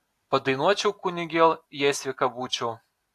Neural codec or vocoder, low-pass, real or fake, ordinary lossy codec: none; 14.4 kHz; real; AAC, 64 kbps